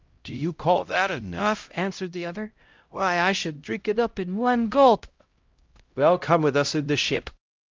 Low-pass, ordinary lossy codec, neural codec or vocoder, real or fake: 7.2 kHz; Opus, 24 kbps; codec, 16 kHz, 0.5 kbps, X-Codec, HuBERT features, trained on LibriSpeech; fake